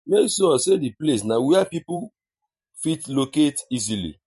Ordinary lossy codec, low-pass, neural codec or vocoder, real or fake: MP3, 48 kbps; 14.4 kHz; vocoder, 44.1 kHz, 128 mel bands every 512 samples, BigVGAN v2; fake